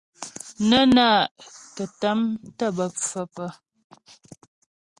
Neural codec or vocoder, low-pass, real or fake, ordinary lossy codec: none; 10.8 kHz; real; Opus, 64 kbps